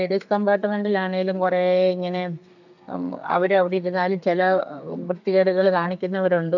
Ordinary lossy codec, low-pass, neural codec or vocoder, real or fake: none; 7.2 kHz; codec, 32 kHz, 1.9 kbps, SNAC; fake